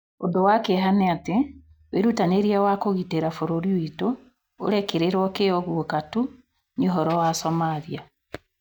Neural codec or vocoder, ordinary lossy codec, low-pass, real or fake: none; none; 19.8 kHz; real